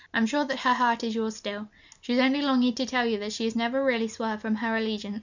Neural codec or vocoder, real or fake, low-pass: none; real; 7.2 kHz